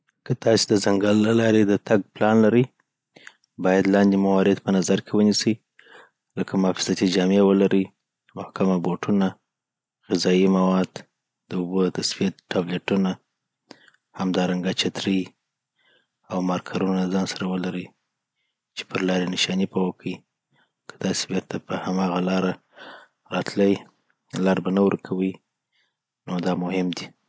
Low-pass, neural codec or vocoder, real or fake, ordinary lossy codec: none; none; real; none